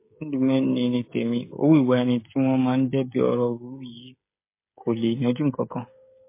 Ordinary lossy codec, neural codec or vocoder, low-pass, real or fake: MP3, 24 kbps; codec, 16 kHz, 16 kbps, FreqCodec, smaller model; 3.6 kHz; fake